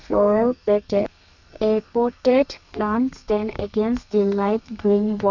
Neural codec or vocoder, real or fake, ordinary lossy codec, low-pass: codec, 32 kHz, 1.9 kbps, SNAC; fake; none; 7.2 kHz